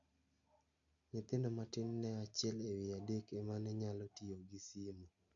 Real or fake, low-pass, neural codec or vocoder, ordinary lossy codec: real; 7.2 kHz; none; none